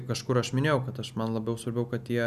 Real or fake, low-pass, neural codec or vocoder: real; 14.4 kHz; none